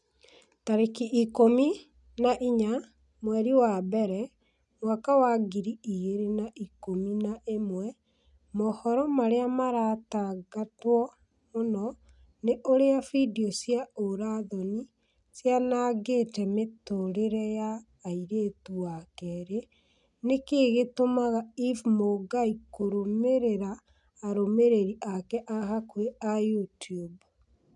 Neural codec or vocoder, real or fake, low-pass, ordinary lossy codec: none; real; 10.8 kHz; none